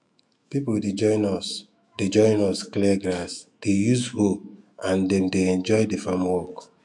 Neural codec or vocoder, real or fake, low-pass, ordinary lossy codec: autoencoder, 48 kHz, 128 numbers a frame, DAC-VAE, trained on Japanese speech; fake; 10.8 kHz; none